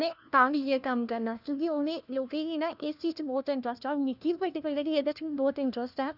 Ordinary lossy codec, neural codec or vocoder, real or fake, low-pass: none; codec, 16 kHz, 1 kbps, FunCodec, trained on LibriTTS, 50 frames a second; fake; 5.4 kHz